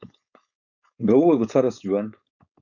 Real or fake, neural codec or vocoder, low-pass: fake; codec, 16 kHz, 4.8 kbps, FACodec; 7.2 kHz